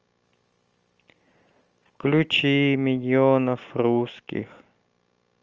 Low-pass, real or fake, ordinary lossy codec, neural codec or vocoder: 7.2 kHz; real; Opus, 24 kbps; none